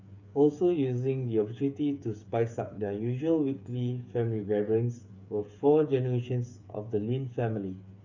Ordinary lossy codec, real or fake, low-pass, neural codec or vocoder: none; fake; 7.2 kHz; codec, 16 kHz, 8 kbps, FreqCodec, smaller model